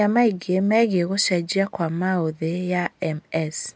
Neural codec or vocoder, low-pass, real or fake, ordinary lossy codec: none; none; real; none